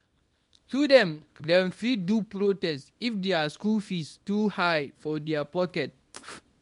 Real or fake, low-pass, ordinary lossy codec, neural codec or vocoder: fake; 10.8 kHz; MP3, 64 kbps; codec, 24 kHz, 0.9 kbps, WavTokenizer, small release